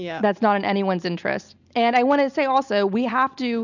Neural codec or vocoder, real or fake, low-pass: none; real; 7.2 kHz